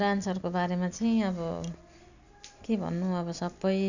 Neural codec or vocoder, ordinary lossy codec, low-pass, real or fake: none; none; 7.2 kHz; real